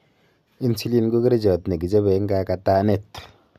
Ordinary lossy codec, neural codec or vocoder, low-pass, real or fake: none; none; 14.4 kHz; real